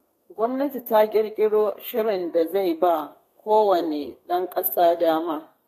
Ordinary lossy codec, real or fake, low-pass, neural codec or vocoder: AAC, 48 kbps; fake; 14.4 kHz; codec, 32 kHz, 1.9 kbps, SNAC